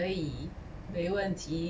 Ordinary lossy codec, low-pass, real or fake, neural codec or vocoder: none; none; real; none